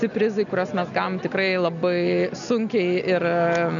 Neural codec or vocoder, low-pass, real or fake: none; 7.2 kHz; real